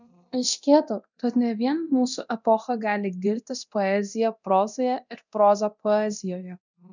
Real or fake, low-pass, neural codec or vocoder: fake; 7.2 kHz; codec, 24 kHz, 0.9 kbps, DualCodec